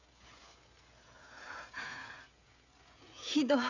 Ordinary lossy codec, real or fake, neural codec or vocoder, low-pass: none; fake; codec, 16 kHz, 16 kbps, FreqCodec, smaller model; 7.2 kHz